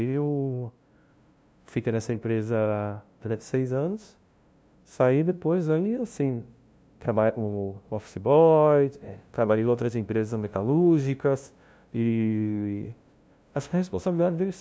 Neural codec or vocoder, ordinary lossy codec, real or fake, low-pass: codec, 16 kHz, 0.5 kbps, FunCodec, trained on LibriTTS, 25 frames a second; none; fake; none